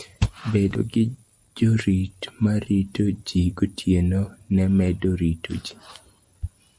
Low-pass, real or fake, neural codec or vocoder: 9.9 kHz; real; none